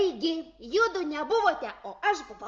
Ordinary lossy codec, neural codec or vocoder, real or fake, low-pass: Opus, 32 kbps; none; real; 7.2 kHz